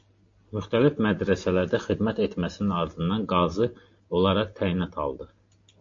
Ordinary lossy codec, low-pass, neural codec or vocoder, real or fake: MP3, 48 kbps; 7.2 kHz; none; real